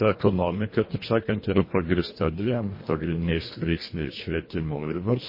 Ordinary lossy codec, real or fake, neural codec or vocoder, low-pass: MP3, 24 kbps; fake; codec, 24 kHz, 1.5 kbps, HILCodec; 5.4 kHz